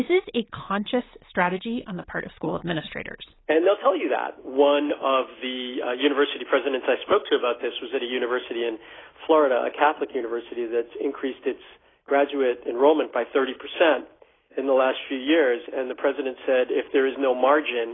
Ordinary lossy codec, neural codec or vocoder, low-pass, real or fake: AAC, 16 kbps; none; 7.2 kHz; real